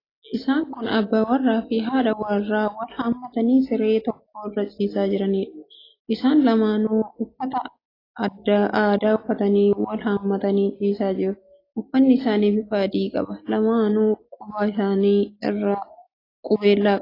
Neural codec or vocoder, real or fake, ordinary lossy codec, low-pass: none; real; AAC, 24 kbps; 5.4 kHz